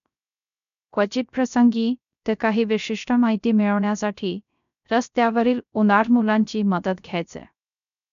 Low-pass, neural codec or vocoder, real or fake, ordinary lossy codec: 7.2 kHz; codec, 16 kHz, 0.3 kbps, FocalCodec; fake; none